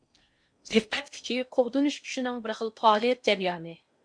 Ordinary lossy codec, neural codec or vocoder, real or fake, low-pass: MP3, 64 kbps; codec, 16 kHz in and 24 kHz out, 0.6 kbps, FocalCodec, streaming, 4096 codes; fake; 9.9 kHz